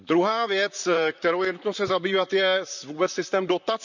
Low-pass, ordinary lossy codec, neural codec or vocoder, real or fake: 7.2 kHz; none; vocoder, 44.1 kHz, 128 mel bands, Pupu-Vocoder; fake